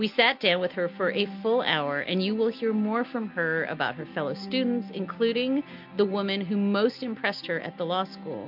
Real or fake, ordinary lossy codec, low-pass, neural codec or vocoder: real; MP3, 48 kbps; 5.4 kHz; none